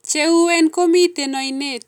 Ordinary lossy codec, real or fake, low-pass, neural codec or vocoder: none; real; 19.8 kHz; none